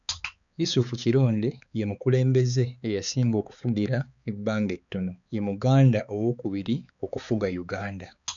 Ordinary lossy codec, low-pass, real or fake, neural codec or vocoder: none; 7.2 kHz; fake; codec, 16 kHz, 4 kbps, X-Codec, HuBERT features, trained on balanced general audio